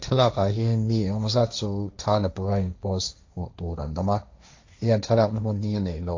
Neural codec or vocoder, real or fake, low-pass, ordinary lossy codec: codec, 16 kHz, 1.1 kbps, Voila-Tokenizer; fake; 7.2 kHz; AAC, 48 kbps